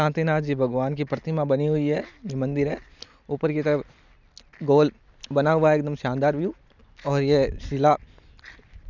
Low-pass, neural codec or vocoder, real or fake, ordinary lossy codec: 7.2 kHz; none; real; none